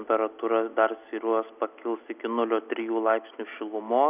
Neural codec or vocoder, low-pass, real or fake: none; 3.6 kHz; real